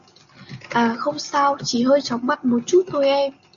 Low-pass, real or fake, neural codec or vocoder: 7.2 kHz; real; none